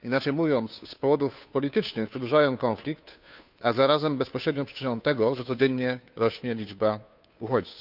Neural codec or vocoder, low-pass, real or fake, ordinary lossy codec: codec, 16 kHz, 2 kbps, FunCodec, trained on Chinese and English, 25 frames a second; 5.4 kHz; fake; none